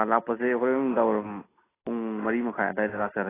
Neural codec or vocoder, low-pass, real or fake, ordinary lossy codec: none; 3.6 kHz; real; AAC, 16 kbps